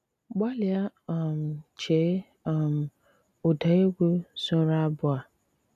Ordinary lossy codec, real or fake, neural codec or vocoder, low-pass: none; real; none; 14.4 kHz